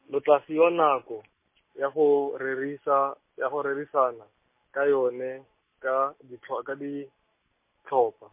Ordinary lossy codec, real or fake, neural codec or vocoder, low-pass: MP3, 24 kbps; real; none; 3.6 kHz